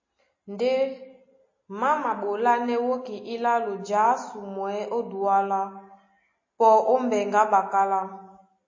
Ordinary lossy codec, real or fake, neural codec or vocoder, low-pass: MP3, 32 kbps; real; none; 7.2 kHz